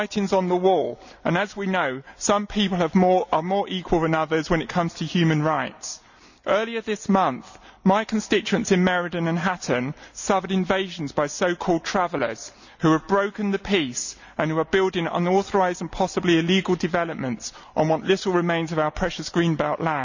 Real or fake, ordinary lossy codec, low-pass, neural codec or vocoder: real; none; 7.2 kHz; none